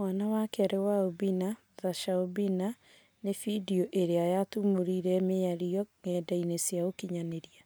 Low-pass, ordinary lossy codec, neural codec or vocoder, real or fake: none; none; none; real